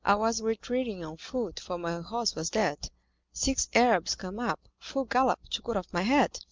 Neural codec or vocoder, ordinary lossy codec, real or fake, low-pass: none; Opus, 24 kbps; real; 7.2 kHz